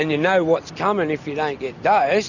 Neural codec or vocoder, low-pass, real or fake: none; 7.2 kHz; real